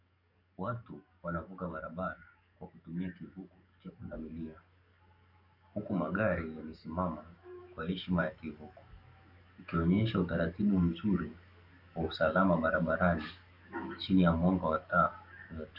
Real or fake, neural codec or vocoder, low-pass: fake; codec, 44.1 kHz, 7.8 kbps, DAC; 5.4 kHz